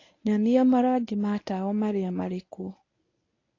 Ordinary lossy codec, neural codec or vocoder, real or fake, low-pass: AAC, 32 kbps; codec, 24 kHz, 0.9 kbps, WavTokenizer, medium speech release version 1; fake; 7.2 kHz